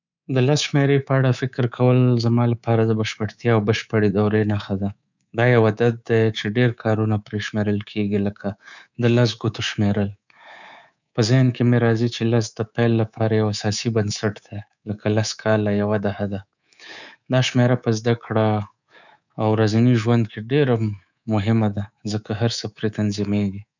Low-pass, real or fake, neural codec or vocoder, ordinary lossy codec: 7.2 kHz; fake; codec, 24 kHz, 3.1 kbps, DualCodec; none